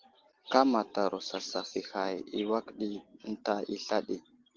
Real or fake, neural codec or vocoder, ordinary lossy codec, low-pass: real; none; Opus, 16 kbps; 7.2 kHz